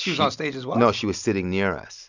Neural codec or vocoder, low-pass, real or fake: none; 7.2 kHz; real